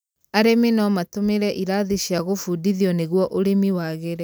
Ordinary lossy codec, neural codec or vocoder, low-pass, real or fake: none; none; none; real